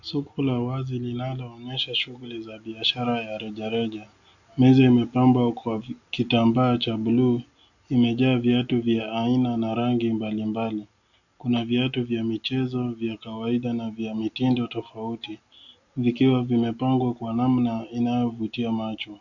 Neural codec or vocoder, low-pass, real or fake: none; 7.2 kHz; real